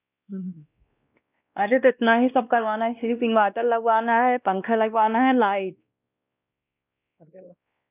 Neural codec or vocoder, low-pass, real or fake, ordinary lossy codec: codec, 16 kHz, 1 kbps, X-Codec, WavLM features, trained on Multilingual LibriSpeech; 3.6 kHz; fake; none